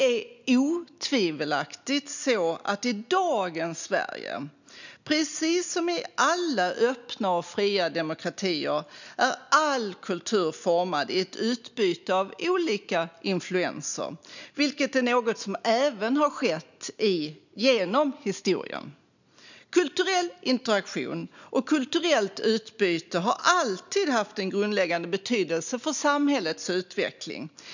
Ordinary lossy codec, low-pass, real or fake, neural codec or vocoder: none; 7.2 kHz; real; none